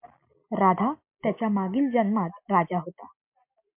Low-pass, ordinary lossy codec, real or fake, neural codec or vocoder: 3.6 kHz; MP3, 32 kbps; real; none